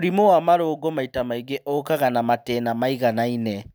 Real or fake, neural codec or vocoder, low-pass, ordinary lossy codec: real; none; none; none